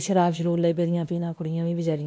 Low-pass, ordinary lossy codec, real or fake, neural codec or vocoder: none; none; fake; codec, 16 kHz, 1 kbps, X-Codec, WavLM features, trained on Multilingual LibriSpeech